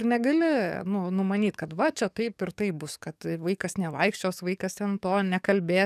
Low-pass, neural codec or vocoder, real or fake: 14.4 kHz; codec, 44.1 kHz, 7.8 kbps, DAC; fake